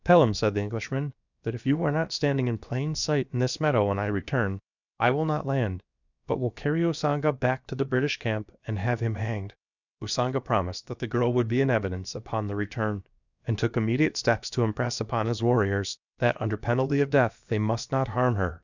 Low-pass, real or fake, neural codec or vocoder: 7.2 kHz; fake; codec, 16 kHz, about 1 kbps, DyCAST, with the encoder's durations